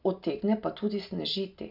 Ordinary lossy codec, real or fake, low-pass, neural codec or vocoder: none; real; 5.4 kHz; none